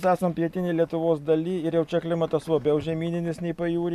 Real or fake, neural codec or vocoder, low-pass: real; none; 14.4 kHz